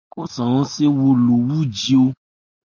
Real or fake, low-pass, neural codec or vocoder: real; 7.2 kHz; none